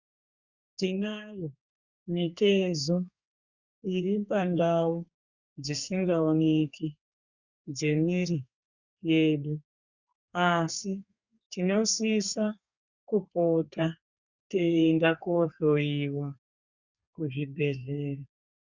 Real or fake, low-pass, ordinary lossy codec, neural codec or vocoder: fake; 7.2 kHz; Opus, 64 kbps; codec, 32 kHz, 1.9 kbps, SNAC